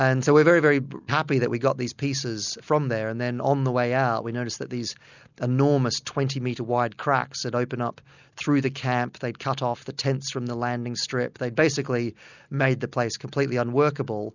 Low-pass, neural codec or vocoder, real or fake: 7.2 kHz; none; real